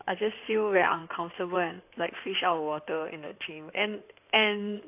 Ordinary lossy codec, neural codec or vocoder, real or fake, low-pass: AAC, 32 kbps; codec, 16 kHz in and 24 kHz out, 2.2 kbps, FireRedTTS-2 codec; fake; 3.6 kHz